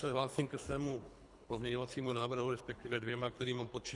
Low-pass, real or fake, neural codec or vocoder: 10.8 kHz; fake; codec, 24 kHz, 3 kbps, HILCodec